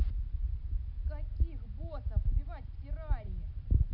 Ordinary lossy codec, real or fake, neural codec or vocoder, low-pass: none; real; none; 5.4 kHz